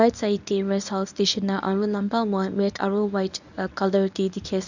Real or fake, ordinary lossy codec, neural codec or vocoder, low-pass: fake; none; codec, 24 kHz, 0.9 kbps, WavTokenizer, medium speech release version 1; 7.2 kHz